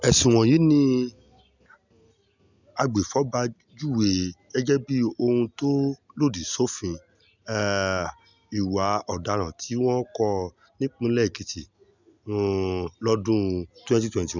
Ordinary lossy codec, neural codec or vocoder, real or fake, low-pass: none; none; real; 7.2 kHz